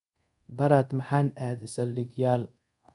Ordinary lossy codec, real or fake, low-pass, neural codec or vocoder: none; fake; 10.8 kHz; codec, 24 kHz, 0.5 kbps, DualCodec